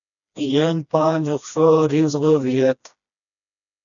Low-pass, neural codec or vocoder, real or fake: 7.2 kHz; codec, 16 kHz, 1 kbps, FreqCodec, smaller model; fake